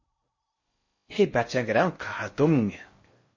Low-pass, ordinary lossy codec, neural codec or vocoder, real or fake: 7.2 kHz; MP3, 32 kbps; codec, 16 kHz in and 24 kHz out, 0.6 kbps, FocalCodec, streaming, 4096 codes; fake